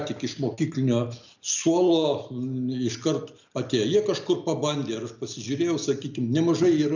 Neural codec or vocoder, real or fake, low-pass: none; real; 7.2 kHz